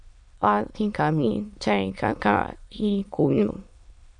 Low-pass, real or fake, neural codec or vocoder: 9.9 kHz; fake; autoencoder, 22.05 kHz, a latent of 192 numbers a frame, VITS, trained on many speakers